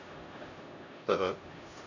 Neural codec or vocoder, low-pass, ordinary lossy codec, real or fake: codec, 16 kHz, 0.5 kbps, X-Codec, HuBERT features, trained on LibriSpeech; 7.2 kHz; none; fake